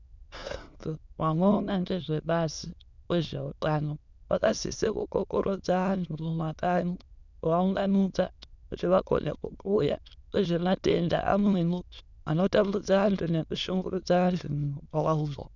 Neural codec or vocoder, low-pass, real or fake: autoencoder, 22.05 kHz, a latent of 192 numbers a frame, VITS, trained on many speakers; 7.2 kHz; fake